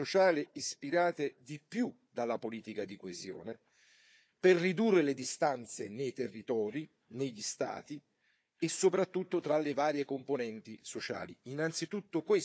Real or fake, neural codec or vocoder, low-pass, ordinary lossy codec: fake; codec, 16 kHz, 4 kbps, FunCodec, trained on Chinese and English, 50 frames a second; none; none